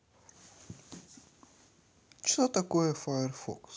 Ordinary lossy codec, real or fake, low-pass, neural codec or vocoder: none; real; none; none